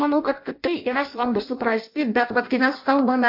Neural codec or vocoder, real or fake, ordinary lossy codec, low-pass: codec, 16 kHz in and 24 kHz out, 0.6 kbps, FireRedTTS-2 codec; fake; MP3, 48 kbps; 5.4 kHz